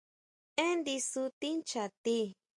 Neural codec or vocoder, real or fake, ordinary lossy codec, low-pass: none; real; MP3, 64 kbps; 10.8 kHz